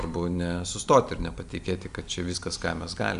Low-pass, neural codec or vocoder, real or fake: 10.8 kHz; none; real